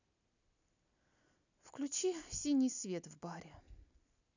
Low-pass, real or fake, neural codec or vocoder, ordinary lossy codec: 7.2 kHz; real; none; none